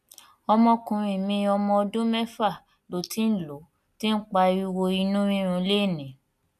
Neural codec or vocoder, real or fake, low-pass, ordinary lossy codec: none; real; 14.4 kHz; none